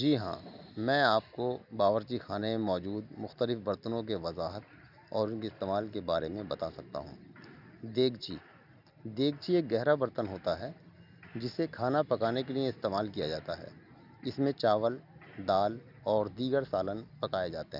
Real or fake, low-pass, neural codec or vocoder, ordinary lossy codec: real; 5.4 kHz; none; none